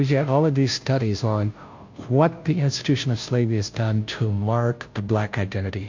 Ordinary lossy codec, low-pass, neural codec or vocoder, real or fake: MP3, 48 kbps; 7.2 kHz; codec, 16 kHz, 0.5 kbps, FunCodec, trained on Chinese and English, 25 frames a second; fake